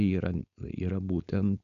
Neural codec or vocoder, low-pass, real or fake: codec, 16 kHz, 4.8 kbps, FACodec; 7.2 kHz; fake